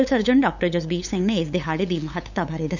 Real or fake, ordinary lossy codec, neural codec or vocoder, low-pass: fake; none; codec, 16 kHz, 8 kbps, FunCodec, trained on LibriTTS, 25 frames a second; 7.2 kHz